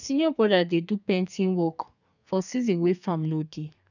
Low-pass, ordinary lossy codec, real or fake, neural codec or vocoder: 7.2 kHz; none; fake; codec, 32 kHz, 1.9 kbps, SNAC